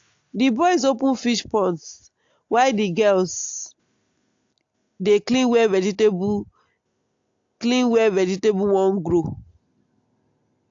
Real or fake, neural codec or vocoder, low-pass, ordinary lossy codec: real; none; 7.2 kHz; AAC, 48 kbps